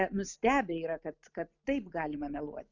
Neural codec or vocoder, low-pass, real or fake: none; 7.2 kHz; real